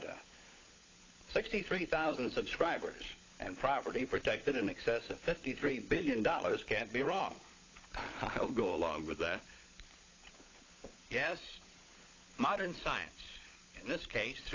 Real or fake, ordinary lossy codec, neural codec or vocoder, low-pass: fake; AAC, 32 kbps; codec, 16 kHz, 16 kbps, FunCodec, trained on LibriTTS, 50 frames a second; 7.2 kHz